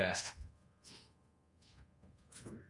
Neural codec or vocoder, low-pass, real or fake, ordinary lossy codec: codec, 24 kHz, 0.5 kbps, DualCodec; 10.8 kHz; fake; Opus, 64 kbps